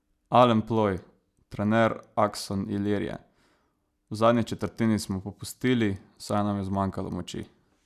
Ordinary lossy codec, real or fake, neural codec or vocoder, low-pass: none; real; none; 14.4 kHz